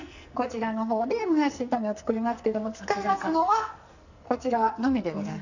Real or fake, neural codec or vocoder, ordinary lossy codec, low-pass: fake; codec, 44.1 kHz, 2.6 kbps, SNAC; none; 7.2 kHz